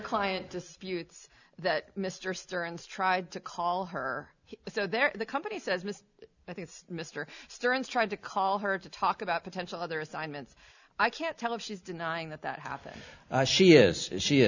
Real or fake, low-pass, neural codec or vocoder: real; 7.2 kHz; none